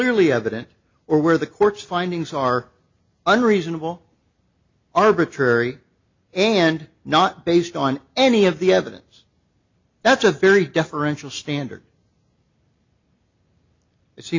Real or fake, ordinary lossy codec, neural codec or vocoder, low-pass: real; MP3, 48 kbps; none; 7.2 kHz